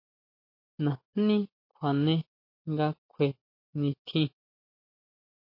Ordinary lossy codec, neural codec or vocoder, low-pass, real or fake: MP3, 32 kbps; none; 5.4 kHz; real